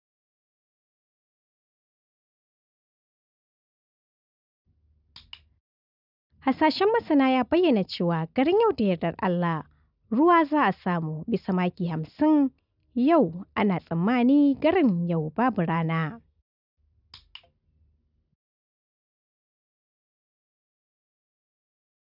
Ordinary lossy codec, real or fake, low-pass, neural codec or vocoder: none; real; 5.4 kHz; none